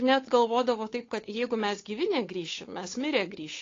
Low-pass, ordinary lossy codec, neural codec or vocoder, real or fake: 7.2 kHz; AAC, 32 kbps; codec, 16 kHz, 8 kbps, FunCodec, trained on Chinese and English, 25 frames a second; fake